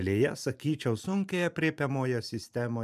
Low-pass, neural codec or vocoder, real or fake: 14.4 kHz; vocoder, 44.1 kHz, 128 mel bands every 512 samples, BigVGAN v2; fake